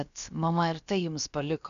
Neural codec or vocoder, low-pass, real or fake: codec, 16 kHz, about 1 kbps, DyCAST, with the encoder's durations; 7.2 kHz; fake